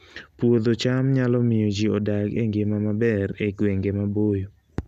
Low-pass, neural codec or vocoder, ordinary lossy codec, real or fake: 14.4 kHz; none; none; real